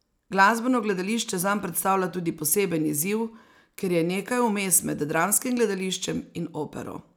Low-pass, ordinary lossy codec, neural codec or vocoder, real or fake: none; none; none; real